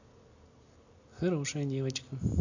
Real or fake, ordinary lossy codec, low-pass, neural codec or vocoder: real; AAC, 48 kbps; 7.2 kHz; none